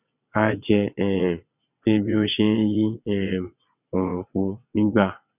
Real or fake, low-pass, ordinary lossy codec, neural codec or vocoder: fake; 3.6 kHz; none; vocoder, 22.05 kHz, 80 mel bands, WaveNeXt